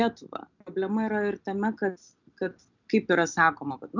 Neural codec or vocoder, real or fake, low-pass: none; real; 7.2 kHz